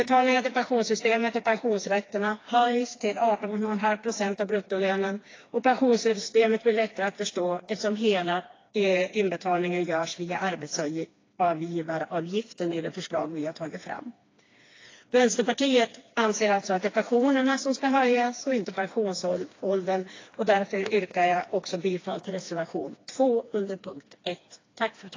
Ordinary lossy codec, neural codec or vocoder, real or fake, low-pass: AAC, 32 kbps; codec, 16 kHz, 2 kbps, FreqCodec, smaller model; fake; 7.2 kHz